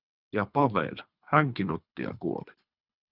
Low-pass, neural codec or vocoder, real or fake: 5.4 kHz; codec, 24 kHz, 3 kbps, HILCodec; fake